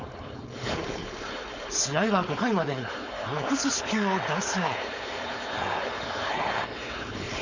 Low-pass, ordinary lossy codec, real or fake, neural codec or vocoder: 7.2 kHz; Opus, 64 kbps; fake; codec, 16 kHz, 4.8 kbps, FACodec